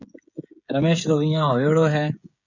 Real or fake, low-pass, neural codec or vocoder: fake; 7.2 kHz; codec, 16 kHz, 16 kbps, FreqCodec, smaller model